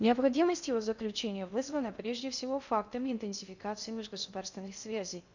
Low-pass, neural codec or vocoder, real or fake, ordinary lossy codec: 7.2 kHz; codec, 16 kHz in and 24 kHz out, 0.6 kbps, FocalCodec, streaming, 2048 codes; fake; none